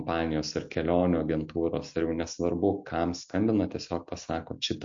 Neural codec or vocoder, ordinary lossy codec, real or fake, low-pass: none; MP3, 48 kbps; real; 7.2 kHz